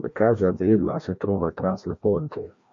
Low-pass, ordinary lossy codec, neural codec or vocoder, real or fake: 7.2 kHz; MP3, 48 kbps; codec, 16 kHz, 1 kbps, FreqCodec, larger model; fake